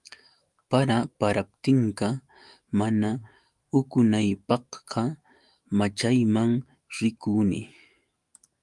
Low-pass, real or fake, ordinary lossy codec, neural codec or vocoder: 10.8 kHz; fake; Opus, 32 kbps; autoencoder, 48 kHz, 128 numbers a frame, DAC-VAE, trained on Japanese speech